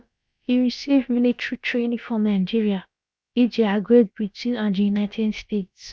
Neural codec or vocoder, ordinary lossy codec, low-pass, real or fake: codec, 16 kHz, about 1 kbps, DyCAST, with the encoder's durations; none; none; fake